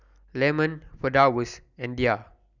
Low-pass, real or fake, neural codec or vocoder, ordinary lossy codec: 7.2 kHz; real; none; none